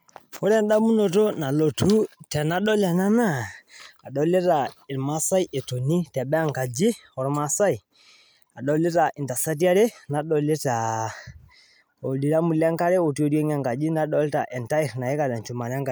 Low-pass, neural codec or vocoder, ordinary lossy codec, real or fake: none; none; none; real